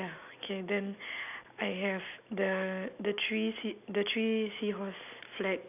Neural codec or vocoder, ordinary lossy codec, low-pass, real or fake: none; none; 3.6 kHz; real